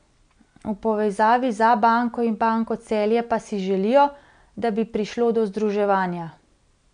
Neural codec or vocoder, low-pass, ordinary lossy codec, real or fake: none; 9.9 kHz; none; real